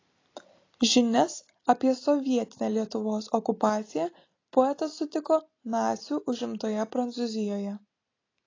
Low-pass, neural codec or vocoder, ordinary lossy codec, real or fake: 7.2 kHz; none; AAC, 32 kbps; real